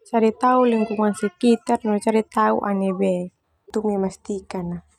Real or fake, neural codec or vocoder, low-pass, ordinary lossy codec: real; none; 19.8 kHz; none